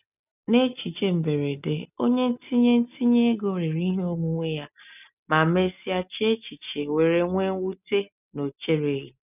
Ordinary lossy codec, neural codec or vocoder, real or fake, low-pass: none; none; real; 3.6 kHz